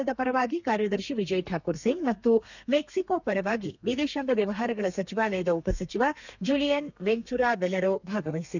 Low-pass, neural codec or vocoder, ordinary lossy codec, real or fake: 7.2 kHz; codec, 32 kHz, 1.9 kbps, SNAC; none; fake